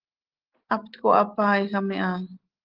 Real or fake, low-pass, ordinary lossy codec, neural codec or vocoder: real; 5.4 kHz; Opus, 16 kbps; none